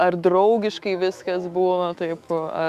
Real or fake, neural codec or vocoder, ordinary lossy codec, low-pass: fake; autoencoder, 48 kHz, 128 numbers a frame, DAC-VAE, trained on Japanese speech; Opus, 64 kbps; 14.4 kHz